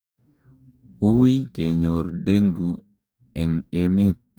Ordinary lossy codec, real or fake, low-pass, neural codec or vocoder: none; fake; none; codec, 44.1 kHz, 2.6 kbps, DAC